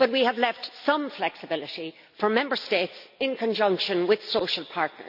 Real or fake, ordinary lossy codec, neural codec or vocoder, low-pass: real; none; none; 5.4 kHz